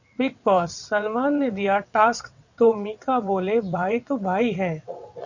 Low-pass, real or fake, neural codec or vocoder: 7.2 kHz; fake; vocoder, 22.05 kHz, 80 mel bands, WaveNeXt